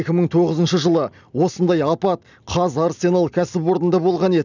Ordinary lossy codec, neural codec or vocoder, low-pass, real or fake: none; none; 7.2 kHz; real